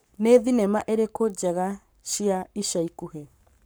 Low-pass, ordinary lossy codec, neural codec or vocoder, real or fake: none; none; codec, 44.1 kHz, 7.8 kbps, Pupu-Codec; fake